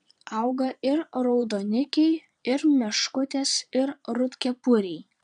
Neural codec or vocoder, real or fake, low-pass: vocoder, 22.05 kHz, 80 mel bands, Vocos; fake; 9.9 kHz